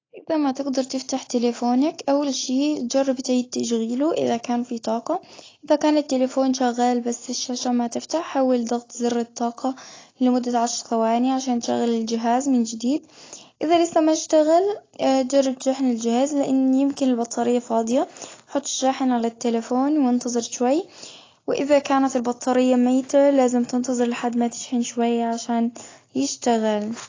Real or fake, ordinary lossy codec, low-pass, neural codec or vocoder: real; AAC, 32 kbps; 7.2 kHz; none